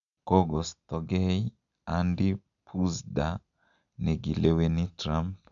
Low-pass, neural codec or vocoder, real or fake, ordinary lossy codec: 7.2 kHz; none; real; none